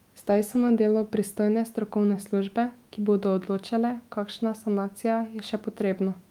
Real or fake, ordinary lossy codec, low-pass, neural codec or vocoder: fake; Opus, 32 kbps; 19.8 kHz; autoencoder, 48 kHz, 128 numbers a frame, DAC-VAE, trained on Japanese speech